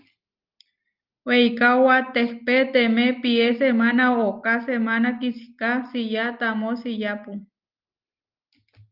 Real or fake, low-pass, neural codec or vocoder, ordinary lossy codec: real; 5.4 kHz; none; Opus, 32 kbps